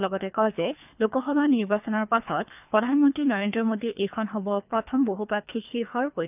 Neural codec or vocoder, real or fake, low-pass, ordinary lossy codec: codec, 24 kHz, 3 kbps, HILCodec; fake; 3.6 kHz; none